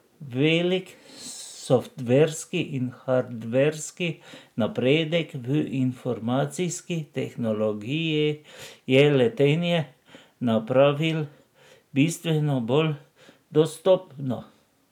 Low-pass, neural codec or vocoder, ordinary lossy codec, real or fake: 19.8 kHz; none; none; real